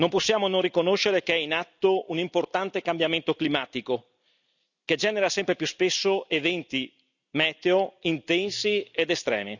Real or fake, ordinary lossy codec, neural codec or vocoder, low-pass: real; none; none; 7.2 kHz